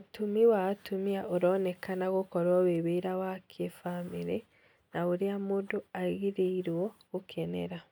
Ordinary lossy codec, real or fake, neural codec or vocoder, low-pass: none; real; none; 19.8 kHz